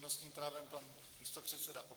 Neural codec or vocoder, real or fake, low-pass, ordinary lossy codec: codec, 44.1 kHz, 7.8 kbps, Pupu-Codec; fake; 19.8 kHz; Opus, 32 kbps